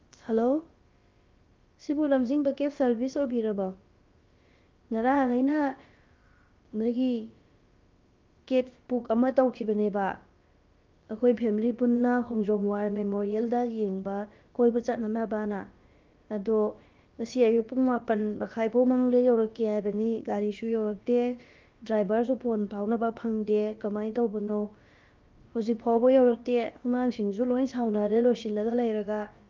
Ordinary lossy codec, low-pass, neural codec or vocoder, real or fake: Opus, 32 kbps; 7.2 kHz; codec, 16 kHz, about 1 kbps, DyCAST, with the encoder's durations; fake